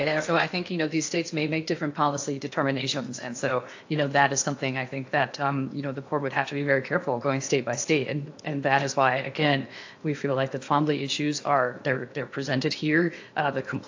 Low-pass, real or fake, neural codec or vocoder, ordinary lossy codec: 7.2 kHz; fake; codec, 16 kHz in and 24 kHz out, 0.8 kbps, FocalCodec, streaming, 65536 codes; AAC, 48 kbps